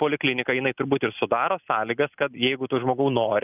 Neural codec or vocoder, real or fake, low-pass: none; real; 3.6 kHz